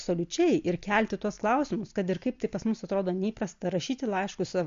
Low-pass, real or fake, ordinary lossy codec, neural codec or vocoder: 7.2 kHz; real; MP3, 48 kbps; none